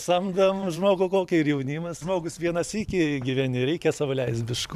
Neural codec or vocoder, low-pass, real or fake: vocoder, 44.1 kHz, 128 mel bands every 512 samples, BigVGAN v2; 14.4 kHz; fake